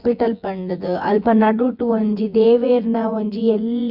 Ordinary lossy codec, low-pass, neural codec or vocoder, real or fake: Opus, 64 kbps; 5.4 kHz; vocoder, 24 kHz, 100 mel bands, Vocos; fake